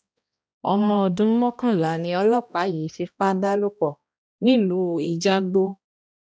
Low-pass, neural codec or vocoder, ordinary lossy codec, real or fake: none; codec, 16 kHz, 1 kbps, X-Codec, HuBERT features, trained on balanced general audio; none; fake